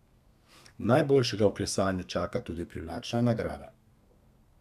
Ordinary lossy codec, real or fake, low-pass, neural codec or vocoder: none; fake; 14.4 kHz; codec, 32 kHz, 1.9 kbps, SNAC